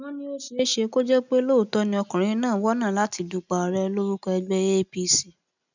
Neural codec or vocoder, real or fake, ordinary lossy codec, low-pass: none; real; none; 7.2 kHz